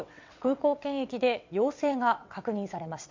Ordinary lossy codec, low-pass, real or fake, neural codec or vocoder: MP3, 64 kbps; 7.2 kHz; fake; vocoder, 22.05 kHz, 80 mel bands, WaveNeXt